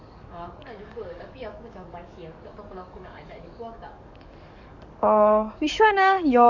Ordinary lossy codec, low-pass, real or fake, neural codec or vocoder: none; 7.2 kHz; fake; codec, 44.1 kHz, 7.8 kbps, DAC